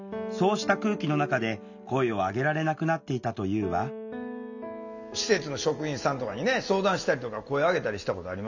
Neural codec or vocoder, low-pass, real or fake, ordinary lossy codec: none; 7.2 kHz; real; none